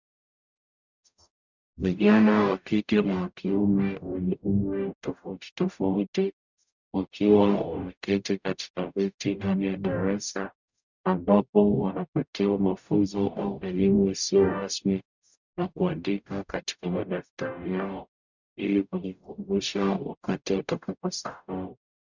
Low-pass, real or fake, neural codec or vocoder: 7.2 kHz; fake; codec, 44.1 kHz, 0.9 kbps, DAC